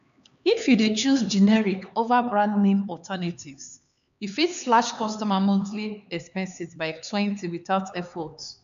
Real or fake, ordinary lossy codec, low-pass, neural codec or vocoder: fake; MP3, 96 kbps; 7.2 kHz; codec, 16 kHz, 4 kbps, X-Codec, HuBERT features, trained on LibriSpeech